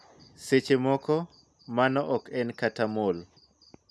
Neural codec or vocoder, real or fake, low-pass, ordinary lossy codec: none; real; none; none